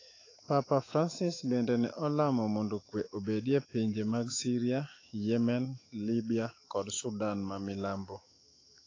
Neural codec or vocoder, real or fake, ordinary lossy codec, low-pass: autoencoder, 48 kHz, 128 numbers a frame, DAC-VAE, trained on Japanese speech; fake; AAC, 32 kbps; 7.2 kHz